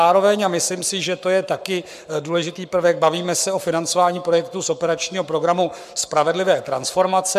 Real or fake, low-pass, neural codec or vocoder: fake; 14.4 kHz; codec, 44.1 kHz, 7.8 kbps, Pupu-Codec